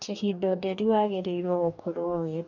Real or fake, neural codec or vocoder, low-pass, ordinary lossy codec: fake; codec, 44.1 kHz, 2.6 kbps, DAC; 7.2 kHz; none